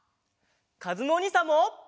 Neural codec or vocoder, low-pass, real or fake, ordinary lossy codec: none; none; real; none